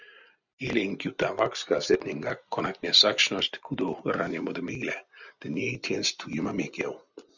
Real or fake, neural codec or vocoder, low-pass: real; none; 7.2 kHz